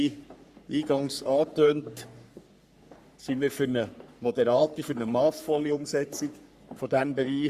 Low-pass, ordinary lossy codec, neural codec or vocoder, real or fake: 14.4 kHz; Opus, 64 kbps; codec, 44.1 kHz, 3.4 kbps, Pupu-Codec; fake